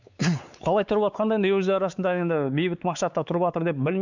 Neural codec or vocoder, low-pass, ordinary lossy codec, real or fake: codec, 16 kHz, 4 kbps, X-Codec, WavLM features, trained on Multilingual LibriSpeech; 7.2 kHz; none; fake